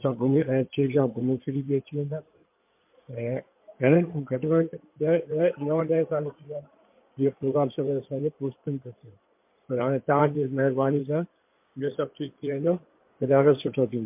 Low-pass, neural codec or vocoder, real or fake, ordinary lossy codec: 3.6 kHz; codec, 16 kHz in and 24 kHz out, 2.2 kbps, FireRedTTS-2 codec; fake; MP3, 32 kbps